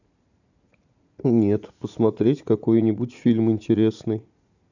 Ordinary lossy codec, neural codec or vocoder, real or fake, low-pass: none; vocoder, 44.1 kHz, 80 mel bands, Vocos; fake; 7.2 kHz